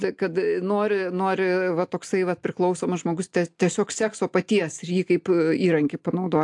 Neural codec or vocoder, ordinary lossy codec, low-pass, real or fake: none; AAC, 64 kbps; 10.8 kHz; real